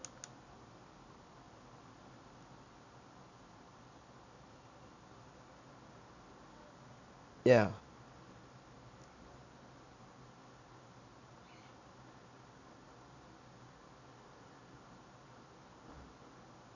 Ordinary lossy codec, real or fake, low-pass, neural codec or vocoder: none; real; 7.2 kHz; none